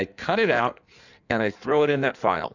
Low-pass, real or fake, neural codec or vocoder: 7.2 kHz; fake; codec, 16 kHz in and 24 kHz out, 1.1 kbps, FireRedTTS-2 codec